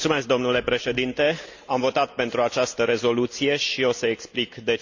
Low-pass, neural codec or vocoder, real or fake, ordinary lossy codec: 7.2 kHz; none; real; Opus, 64 kbps